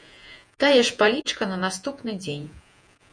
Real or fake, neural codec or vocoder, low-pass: fake; vocoder, 48 kHz, 128 mel bands, Vocos; 9.9 kHz